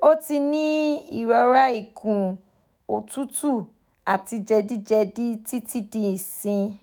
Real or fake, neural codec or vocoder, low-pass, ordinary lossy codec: fake; autoencoder, 48 kHz, 128 numbers a frame, DAC-VAE, trained on Japanese speech; none; none